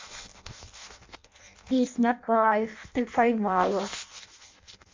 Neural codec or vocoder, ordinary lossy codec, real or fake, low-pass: codec, 16 kHz in and 24 kHz out, 0.6 kbps, FireRedTTS-2 codec; MP3, 64 kbps; fake; 7.2 kHz